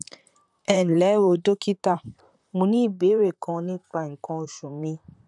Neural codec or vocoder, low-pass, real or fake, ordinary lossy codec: vocoder, 44.1 kHz, 128 mel bands, Pupu-Vocoder; 10.8 kHz; fake; none